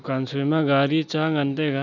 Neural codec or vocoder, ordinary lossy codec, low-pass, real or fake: none; none; 7.2 kHz; real